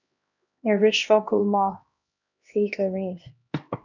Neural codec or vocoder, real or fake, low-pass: codec, 16 kHz, 1 kbps, X-Codec, HuBERT features, trained on LibriSpeech; fake; 7.2 kHz